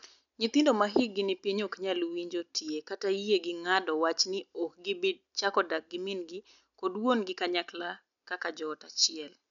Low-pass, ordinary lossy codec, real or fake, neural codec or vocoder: 7.2 kHz; none; real; none